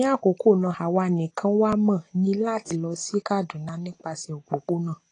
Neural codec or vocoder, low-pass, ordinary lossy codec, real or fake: none; 9.9 kHz; AAC, 32 kbps; real